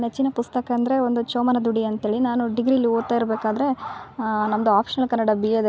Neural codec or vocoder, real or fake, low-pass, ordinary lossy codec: none; real; none; none